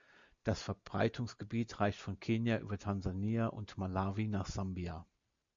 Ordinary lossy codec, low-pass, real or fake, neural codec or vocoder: MP3, 64 kbps; 7.2 kHz; real; none